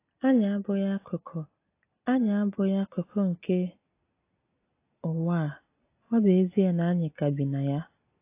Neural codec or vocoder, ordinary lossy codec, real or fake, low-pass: none; AAC, 24 kbps; real; 3.6 kHz